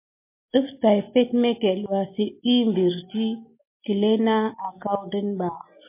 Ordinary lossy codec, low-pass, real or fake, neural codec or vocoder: MP3, 24 kbps; 3.6 kHz; real; none